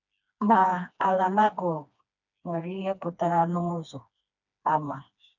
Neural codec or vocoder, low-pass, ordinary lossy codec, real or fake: codec, 16 kHz, 2 kbps, FreqCodec, smaller model; 7.2 kHz; none; fake